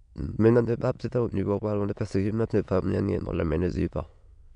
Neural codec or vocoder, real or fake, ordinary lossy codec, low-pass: autoencoder, 22.05 kHz, a latent of 192 numbers a frame, VITS, trained on many speakers; fake; none; 9.9 kHz